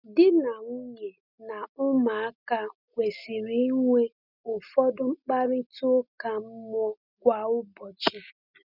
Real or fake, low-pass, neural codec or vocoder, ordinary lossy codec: real; 5.4 kHz; none; none